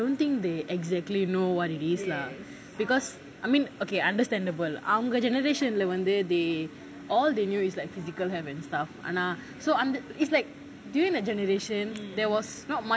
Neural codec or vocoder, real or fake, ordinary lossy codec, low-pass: none; real; none; none